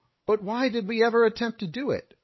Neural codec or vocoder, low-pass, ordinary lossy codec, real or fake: codec, 16 kHz, 8 kbps, FreqCodec, larger model; 7.2 kHz; MP3, 24 kbps; fake